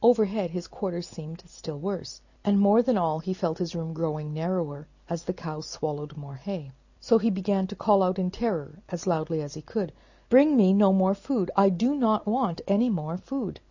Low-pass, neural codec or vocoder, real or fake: 7.2 kHz; none; real